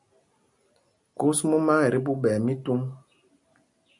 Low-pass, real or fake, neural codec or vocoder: 10.8 kHz; real; none